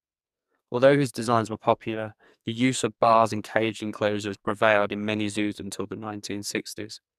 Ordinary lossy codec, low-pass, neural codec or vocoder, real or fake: none; 14.4 kHz; codec, 44.1 kHz, 2.6 kbps, SNAC; fake